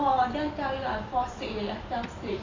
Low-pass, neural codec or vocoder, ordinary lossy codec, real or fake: 7.2 kHz; vocoder, 44.1 kHz, 128 mel bands every 256 samples, BigVGAN v2; AAC, 32 kbps; fake